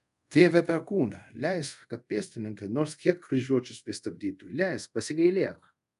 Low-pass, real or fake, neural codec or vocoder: 10.8 kHz; fake; codec, 24 kHz, 0.5 kbps, DualCodec